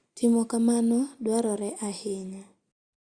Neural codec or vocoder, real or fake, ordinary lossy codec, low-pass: none; real; Opus, 64 kbps; 9.9 kHz